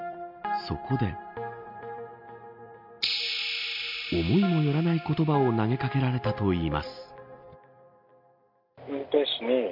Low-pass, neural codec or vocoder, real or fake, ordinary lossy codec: 5.4 kHz; none; real; MP3, 48 kbps